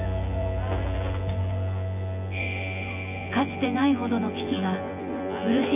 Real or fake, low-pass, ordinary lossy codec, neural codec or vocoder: fake; 3.6 kHz; none; vocoder, 24 kHz, 100 mel bands, Vocos